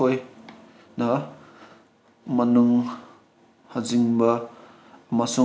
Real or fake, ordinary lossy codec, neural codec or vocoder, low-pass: real; none; none; none